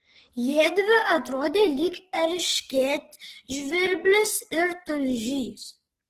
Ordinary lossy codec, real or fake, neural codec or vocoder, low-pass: Opus, 16 kbps; fake; codec, 44.1 kHz, 2.6 kbps, SNAC; 14.4 kHz